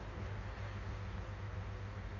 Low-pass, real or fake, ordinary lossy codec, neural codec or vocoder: 7.2 kHz; fake; none; codec, 16 kHz in and 24 kHz out, 1.1 kbps, FireRedTTS-2 codec